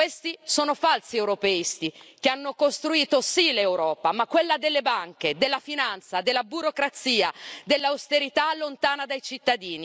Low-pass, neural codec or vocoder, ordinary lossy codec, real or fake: none; none; none; real